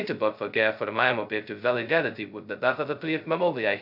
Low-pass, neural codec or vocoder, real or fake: 5.4 kHz; codec, 16 kHz, 0.2 kbps, FocalCodec; fake